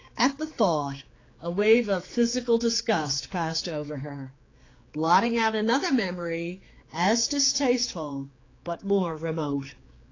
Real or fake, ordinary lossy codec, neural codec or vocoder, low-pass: fake; AAC, 32 kbps; codec, 16 kHz, 4 kbps, X-Codec, HuBERT features, trained on general audio; 7.2 kHz